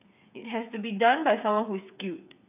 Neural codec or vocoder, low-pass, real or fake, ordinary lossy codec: codec, 16 kHz, 8 kbps, FreqCodec, smaller model; 3.6 kHz; fake; none